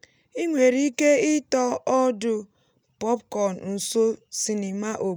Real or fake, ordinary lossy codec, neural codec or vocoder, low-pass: real; none; none; none